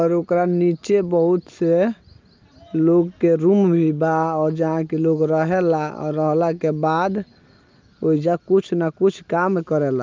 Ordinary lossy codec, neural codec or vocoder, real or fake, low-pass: Opus, 24 kbps; none; real; 7.2 kHz